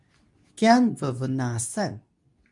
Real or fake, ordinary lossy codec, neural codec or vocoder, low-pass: fake; MP3, 96 kbps; codec, 24 kHz, 0.9 kbps, WavTokenizer, medium speech release version 2; 10.8 kHz